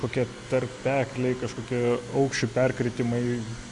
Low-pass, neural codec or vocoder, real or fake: 10.8 kHz; none; real